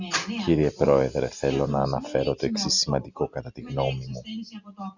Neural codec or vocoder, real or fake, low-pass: none; real; 7.2 kHz